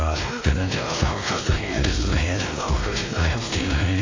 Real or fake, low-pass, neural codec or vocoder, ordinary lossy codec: fake; 7.2 kHz; codec, 16 kHz, 1 kbps, X-Codec, WavLM features, trained on Multilingual LibriSpeech; AAC, 32 kbps